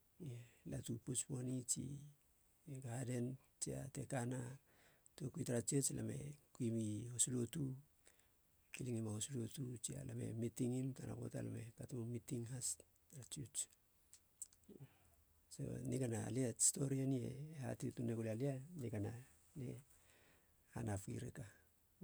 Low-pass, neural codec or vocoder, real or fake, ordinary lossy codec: none; none; real; none